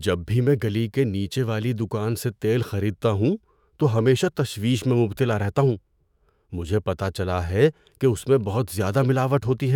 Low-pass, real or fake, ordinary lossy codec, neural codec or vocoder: 19.8 kHz; fake; none; autoencoder, 48 kHz, 128 numbers a frame, DAC-VAE, trained on Japanese speech